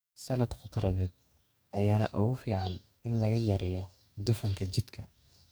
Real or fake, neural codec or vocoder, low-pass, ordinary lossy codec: fake; codec, 44.1 kHz, 2.6 kbps, DAC; none; none